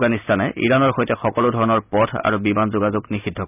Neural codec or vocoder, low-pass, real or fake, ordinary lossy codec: none; 3.6 kHz; real; none